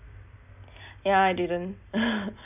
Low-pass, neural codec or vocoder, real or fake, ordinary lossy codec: 3.6 kHz; none; real; none